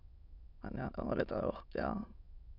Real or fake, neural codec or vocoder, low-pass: fake; autoencoder, 22.05 kHz, a latent of 192 numbers a frame, VITS, trained on many speakers; 5.4 kHz